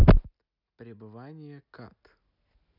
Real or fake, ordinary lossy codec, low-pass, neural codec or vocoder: real; none; 5.4 kHz; none